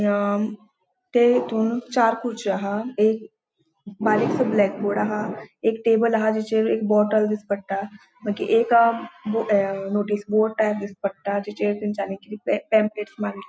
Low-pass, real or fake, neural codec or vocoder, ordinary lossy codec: none; real; none; none